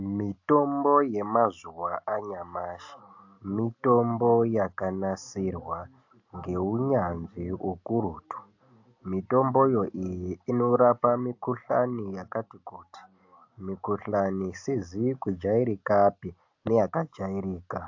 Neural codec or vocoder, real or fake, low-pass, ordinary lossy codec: none; real; 7.2 kHz; AAC, 48 kbps